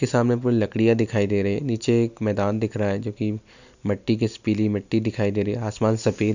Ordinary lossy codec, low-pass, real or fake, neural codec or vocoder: none; 7.2 kHz; real; none